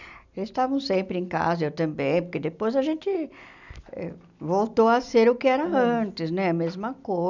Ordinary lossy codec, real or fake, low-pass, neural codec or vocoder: none; real; 7.2 kHz; none